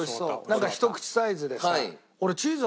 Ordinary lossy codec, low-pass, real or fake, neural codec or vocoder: none; none; real; none